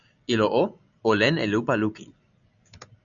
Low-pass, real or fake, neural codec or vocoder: 7.2 kHz; real; none